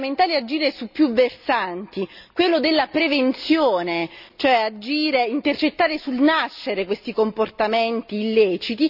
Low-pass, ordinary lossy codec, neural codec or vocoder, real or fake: 5.4 kHz; none; none; real